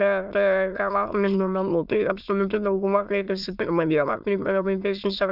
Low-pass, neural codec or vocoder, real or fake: 5.4 kHz; autoencoder, 22.05 kHz, a latent of 192 numbers a frame, VITS, trained on many speakers; fake